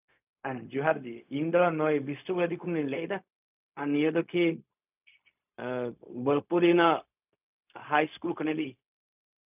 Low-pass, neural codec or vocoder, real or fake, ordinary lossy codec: 3.6 kHz; codec, 16 kHz, 0.4 kbps, LongCat-Audio-Codec; fake; none